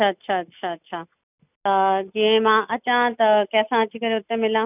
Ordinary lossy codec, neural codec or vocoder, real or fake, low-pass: none; none; real; 3.6 kHz